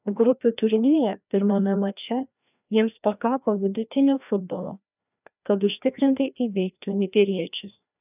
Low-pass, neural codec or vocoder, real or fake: 3.6 kHz; codec, 16 kHz, 1 kbps, FreqCodec, larger model; fake